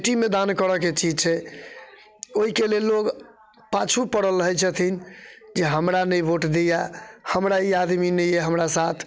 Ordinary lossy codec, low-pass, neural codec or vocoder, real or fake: none; none; none; real